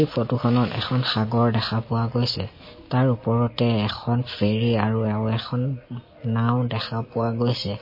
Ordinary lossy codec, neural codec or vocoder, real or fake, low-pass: MP3, 24 kbps; none; real; 5.4 kHz